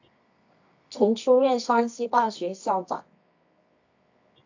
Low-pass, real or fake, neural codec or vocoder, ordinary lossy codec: 7.2 kHz; fake; codec, 24 kHz, 0.9 kbps, WavTokenizer, medium music audio release; AAC, 48 kbps